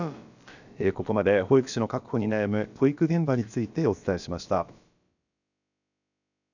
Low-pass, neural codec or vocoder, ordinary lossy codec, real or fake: 7.2 kHz; codec, 16 kHz, about 1 kbps, DyCAST, with the encoder's durations; none; fake